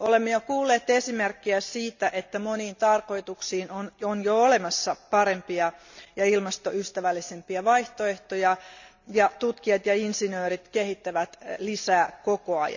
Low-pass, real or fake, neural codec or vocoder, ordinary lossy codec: 7.2 kHz; real; none; none